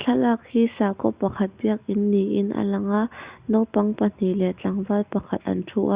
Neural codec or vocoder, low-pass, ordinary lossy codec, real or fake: none; 3.6 kHz; Opus, 64 kbps; real